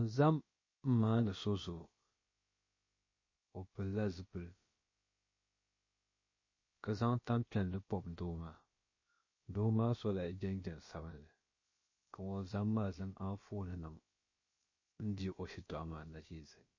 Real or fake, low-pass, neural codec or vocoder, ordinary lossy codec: fake; 7.2 kHz; codec, 16 kHz, about 1 kbps, DyCAST, with the encoder's durations; MP3, 32 kbps